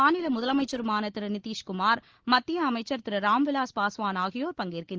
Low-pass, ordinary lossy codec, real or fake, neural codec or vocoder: 7.2 kHz; Opus, 16 kbps; real; none